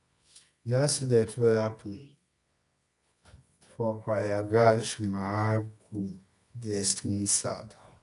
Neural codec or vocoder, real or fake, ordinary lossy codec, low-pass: codec, 24 kHz, 0.9 kbps, WavTokenizer, medium music audio release; fake; none; 10.8 kHz